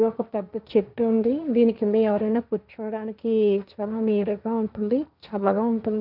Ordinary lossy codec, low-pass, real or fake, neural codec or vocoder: none; 5.4 kHz; fake; codec, 16 kHz, 1.1 kbps, Voila-Tokenizer